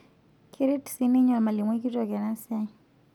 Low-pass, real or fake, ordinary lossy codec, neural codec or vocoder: 19.8 kHz; real; none; none